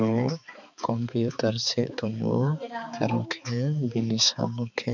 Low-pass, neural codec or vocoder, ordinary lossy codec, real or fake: 7.2 kHz; codec, 16 kHz, 4 kbps, X-Codec, HuBERT features, trained on balanced general audio; none; fake